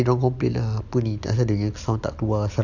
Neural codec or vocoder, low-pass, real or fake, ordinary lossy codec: none; 7.2 kHz; real; none